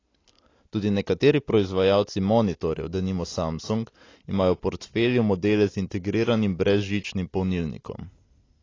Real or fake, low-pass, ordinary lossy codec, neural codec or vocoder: real; 7.2 kHz; AAC, 32 kbps; none